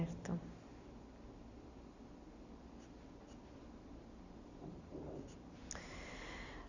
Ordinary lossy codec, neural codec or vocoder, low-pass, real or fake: none; none; 7.2 kHz; real